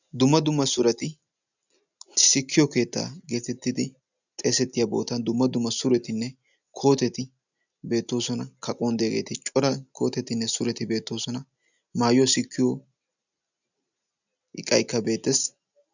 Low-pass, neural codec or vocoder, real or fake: 7.2 kHz; none; real